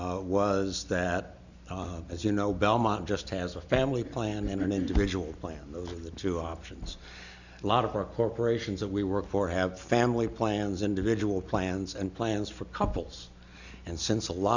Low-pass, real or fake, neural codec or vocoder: 7.2 kHz; real; none